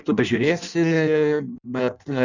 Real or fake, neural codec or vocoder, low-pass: fake; codec, 16 kHz in and 24 kHz out, 0.6 kbps, FireRedTTS-2 codec; 7.2 kHz